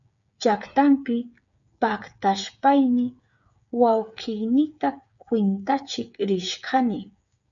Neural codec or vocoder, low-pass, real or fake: codec, 16 kHz, 8 kbps, FreqCodec, smaller model; 7.2 kHz; fake